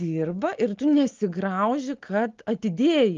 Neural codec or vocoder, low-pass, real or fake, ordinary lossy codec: none; 7.2 kHz; real; Opus, 32 kbps